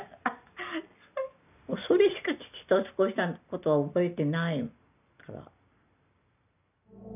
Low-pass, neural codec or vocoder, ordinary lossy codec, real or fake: 3.6 kHz; none; none; real